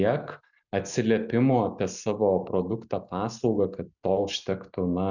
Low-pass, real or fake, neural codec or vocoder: 7.2 kHz; real; none